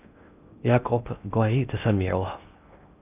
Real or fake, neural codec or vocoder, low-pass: fake; codec, 16 kHz in and 24 kHz out, 0.6 kbps, FocalCodec, streaming, 2048 codes; 3.6 kHz